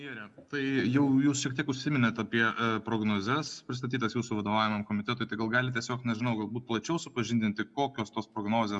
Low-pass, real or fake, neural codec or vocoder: 10.8 kHz; real; none